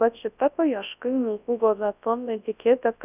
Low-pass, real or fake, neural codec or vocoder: 3.6 kHz; fake; codec, 24 kHz, 0.9 kbps, WavTokenizer, large speech release